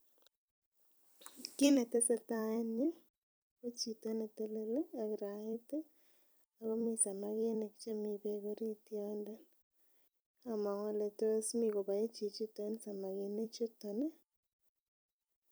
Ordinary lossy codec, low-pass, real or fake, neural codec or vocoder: none; none; fake; vocoder, 44.1 kHz, 128 mel bands every 256 samples, BigVGAN v2